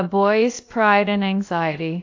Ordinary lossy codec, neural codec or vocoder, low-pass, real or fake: AAC, 48 kbps; codec, 16 kHz, about 1 kbps, DyCAST, with the encoder's durations; 7.2 kHz; fake